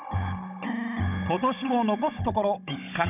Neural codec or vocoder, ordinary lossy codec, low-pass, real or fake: codec, 16 kHz, 16 kbps, FunCodec, trained on Chinese and English, 50 frames a second; none; 3.6 kHz; fake